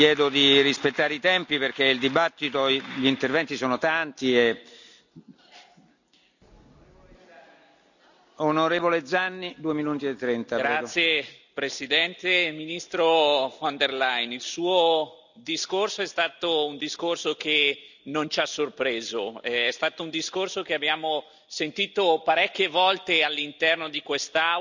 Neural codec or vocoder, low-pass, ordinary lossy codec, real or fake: none; 7.2 kHz; none; real